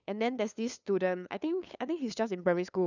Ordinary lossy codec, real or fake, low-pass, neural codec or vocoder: none; fake; 7.2 kHz; codec, 16 kHz, 2 kbps, FunCodec, trained on LibriTTS, 25 frames a second